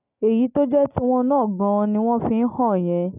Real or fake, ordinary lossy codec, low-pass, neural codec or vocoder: real; none; 3.6 kHz; none